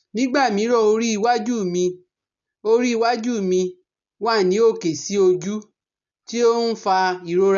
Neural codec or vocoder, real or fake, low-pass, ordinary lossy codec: none; real; 7.2 kHz; none